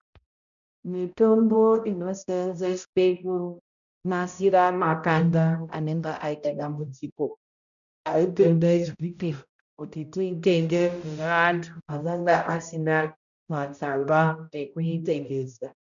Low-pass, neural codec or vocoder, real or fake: 7.2 kHz; codec, 16 kHz, 0.5 kbps, X-Codec, HuBERT features, trained on balanced general audio; fake